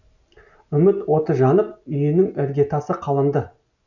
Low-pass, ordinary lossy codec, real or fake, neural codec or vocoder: 7.2 kHz; none; real; none